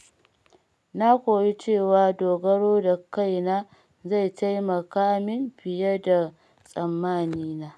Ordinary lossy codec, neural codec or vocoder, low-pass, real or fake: none; none; none; real